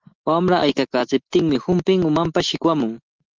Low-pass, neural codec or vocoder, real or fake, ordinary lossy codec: 7.2 kHz; none; real; Opus, 16 kbps